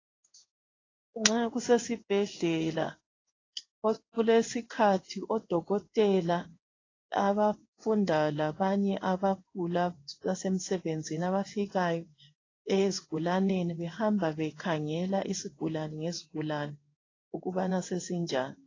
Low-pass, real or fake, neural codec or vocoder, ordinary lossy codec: 7.2 kHz; fake; codec, 16 kHz in and 24 kHz out, 1 kbps, XY-Tokenizer; AAC, 32 kbps